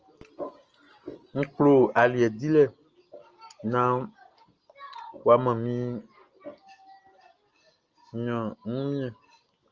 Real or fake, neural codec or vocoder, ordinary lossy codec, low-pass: real; none; Opus, 24 kbps; 7.2 kHz